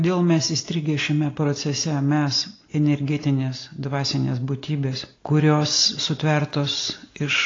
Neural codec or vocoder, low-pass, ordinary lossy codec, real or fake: none; 7.2 kHz; AAC, 32 kbps; real